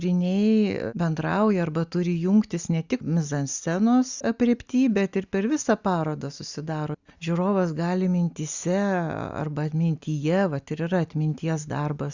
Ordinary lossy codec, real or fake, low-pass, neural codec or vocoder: Opus, 64 kbps; real; 7.2 kHz; none